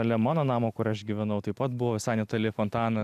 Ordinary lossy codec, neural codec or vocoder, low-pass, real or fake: AAC, 96 kbps; none; 14.4 kHz; real